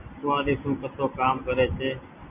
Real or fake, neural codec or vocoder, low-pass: real; none; 3.6 kHz